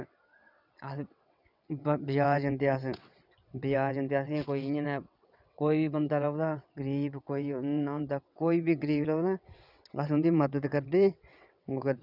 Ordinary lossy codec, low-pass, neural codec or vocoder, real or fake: none; 5.4 kHz; vocoder, 22.05 kHz, 80 mel bands, WaveNeXt; fake